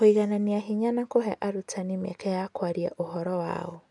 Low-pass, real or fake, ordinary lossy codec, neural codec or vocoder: 10.8 kHz; real; none; none